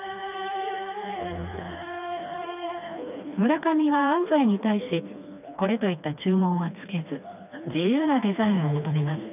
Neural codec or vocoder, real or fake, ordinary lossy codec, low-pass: codec, 16 kHz, 2 kbps, FreqCodec, smaller model; fake; none; 3.6 kHz